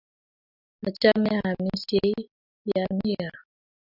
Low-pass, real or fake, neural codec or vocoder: 5.4 kHz; real; none